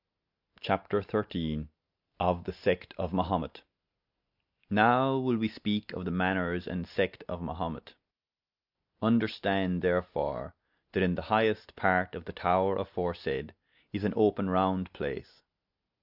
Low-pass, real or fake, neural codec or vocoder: 5.4 kHz; real; none